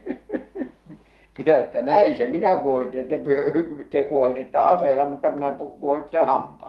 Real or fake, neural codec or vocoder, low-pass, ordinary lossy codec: fake; codec, 32 kHz, 1.9 kbps, SNAC; 14.4 kHz; Opus, 32 kbps